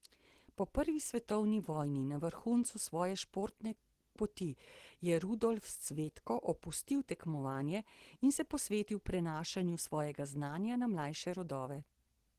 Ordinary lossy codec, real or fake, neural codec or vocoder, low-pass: Opus, 16 kbps; real; none; 14.4 kHz